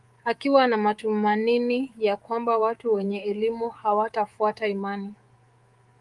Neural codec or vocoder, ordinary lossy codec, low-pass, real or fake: codec, 24 kHz, 3.1 kbps, DualCodec; Opus, 24 kbps; 10.8 kHz; fake